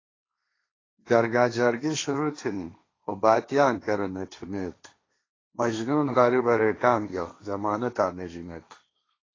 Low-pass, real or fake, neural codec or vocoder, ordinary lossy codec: 7.2 kHz; fake; codec, 16 kHz, 1.1 kbps, Voila-Tokenizer; AAC, 32 kbps